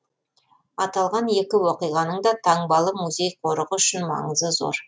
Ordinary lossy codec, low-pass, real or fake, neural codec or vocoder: none; none; real; none